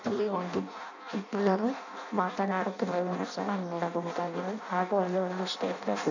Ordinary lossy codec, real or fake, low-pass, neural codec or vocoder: none; fake; 7.2 kHz; codec, 16 kHz in and 24 kHz out, 0.6 kbps, FireRedTTS-2 codec